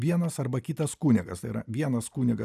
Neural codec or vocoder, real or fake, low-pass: none; real; 14.4 kHz